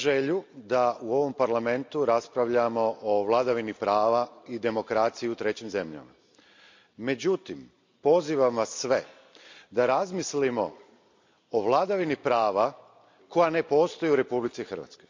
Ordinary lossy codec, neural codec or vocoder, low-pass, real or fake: MP3, 64 kbps; none; 7.2 kHz; real